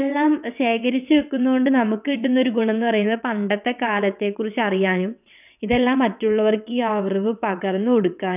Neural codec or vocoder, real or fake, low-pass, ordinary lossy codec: vocoder, 22.05 kHz, 80 mel bands, WaveNeXt; fake; 3.6 kHz; none